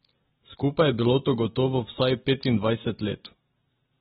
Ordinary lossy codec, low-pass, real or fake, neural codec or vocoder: AAC, 16 kbps; 10.8 kHz; real; none